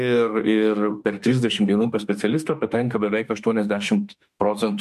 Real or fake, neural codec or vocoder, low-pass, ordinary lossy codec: fake; autoencoder, 48 kHz, 32 numbers a frame, DAC-VAE, trained on Japanese speech; 14.4 kHz; MP3, 64 kbps